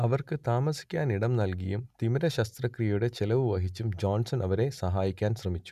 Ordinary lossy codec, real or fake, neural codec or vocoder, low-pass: none; real; none; 14.4 kHz